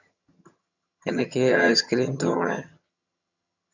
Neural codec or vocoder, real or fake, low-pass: vocoder, 22.05 kHz, 80 mel bands, HiFi-GAN; fake; 7.2 kHz